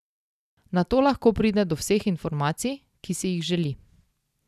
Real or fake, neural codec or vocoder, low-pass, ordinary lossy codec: real; none; 14.4 kHz; none